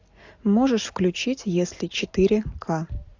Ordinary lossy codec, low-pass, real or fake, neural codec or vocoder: AAC, 48 kbps; 7.2 kHz; real; none